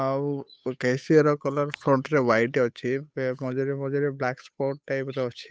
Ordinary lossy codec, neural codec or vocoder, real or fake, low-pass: none; codec, 16 kHz, 8 kbps, FunCodec, trained on Chinese and English, 25 frames a second; fake; none